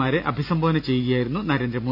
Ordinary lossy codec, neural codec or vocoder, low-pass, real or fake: none; none; 5.4 kHz; real